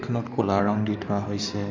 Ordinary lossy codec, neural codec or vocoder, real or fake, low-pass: MP3, 64 kbps; codec, 16 kHz in and 24 kHz out, 2.2 kbps, FireRedTTS-2 codec; fake; 7.2 kHz